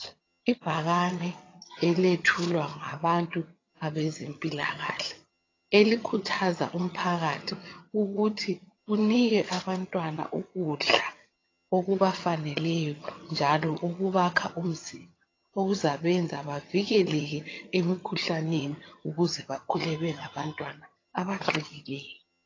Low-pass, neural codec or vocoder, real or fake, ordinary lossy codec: 7.2 kHz; vocoder, 22.05 kHz, 80 mel bands, HiFi-GAN; fake; AAC, 32 kbps